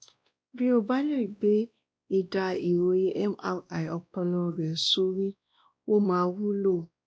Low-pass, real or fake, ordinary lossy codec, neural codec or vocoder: none; fake; none; codec, 16 kHz, 1 kbps, X-Codec, WavLM features, trained on Multilingual LibriSpeech